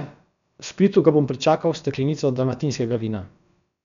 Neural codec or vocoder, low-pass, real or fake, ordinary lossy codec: codec, 16 kHz, about 1 kbps, DyCAST, with the encoder's durations; 7.2 kHz; fake; none